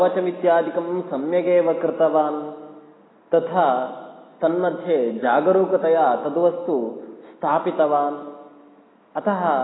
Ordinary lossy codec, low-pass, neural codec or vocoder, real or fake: AAC, 16 kbps; 7.2 kHz; none; real